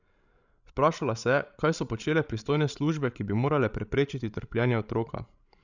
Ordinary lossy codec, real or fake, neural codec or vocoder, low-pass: none; fake; codec, 16 kHz, 16 kbps, FreqCodec, larger model; 7.2 kHz